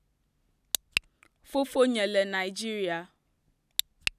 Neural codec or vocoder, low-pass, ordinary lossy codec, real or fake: none; 14.4 kHz; none; real